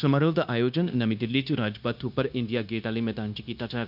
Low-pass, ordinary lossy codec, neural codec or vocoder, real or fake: 5.4 kHz; none; codec, 16 kHz, 0.9 kbps, LongCat-Audio-Codec; fake